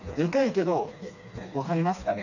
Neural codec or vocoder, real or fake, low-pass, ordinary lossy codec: codec, 16 kHz, 2 kbps, FreqCodec, smaller model; fake; 7.2 kHz; none